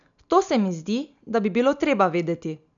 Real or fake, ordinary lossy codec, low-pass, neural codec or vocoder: real; none; 7.2 kHz; none